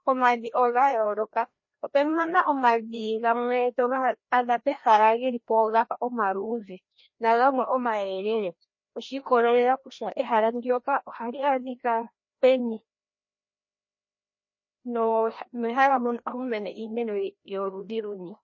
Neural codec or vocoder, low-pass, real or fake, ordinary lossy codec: codec, 16 kHz, 1 kbps, FreqCodec, larger model; 7.2 kHz; fake; MP3, 32 kbps